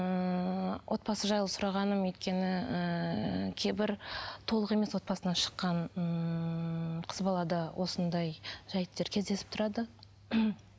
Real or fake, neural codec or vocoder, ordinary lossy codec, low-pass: real; none; none; none